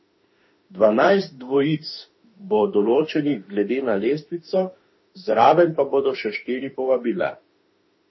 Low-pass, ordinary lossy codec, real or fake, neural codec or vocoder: 7.2 kHz; MP3, 24 kbps; fake; autoencoder, 48 kHz, 32 numbers a frame, DAC-VAE, trained on Japanese speech